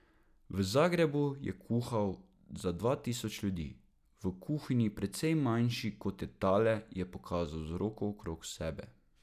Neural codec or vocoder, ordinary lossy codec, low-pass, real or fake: none; none; 14.4 kHz; real